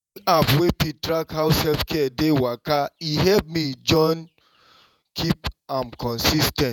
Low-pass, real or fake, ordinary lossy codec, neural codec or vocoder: 19.8 kHz; fake; none; vocoder, 48 kHz, 128 mel bands, Vocos